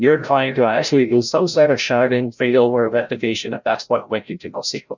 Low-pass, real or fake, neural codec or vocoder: 7.2 kHz; fake; codec, 16 kHz, 0.5 kbps, FreqCodec, larger model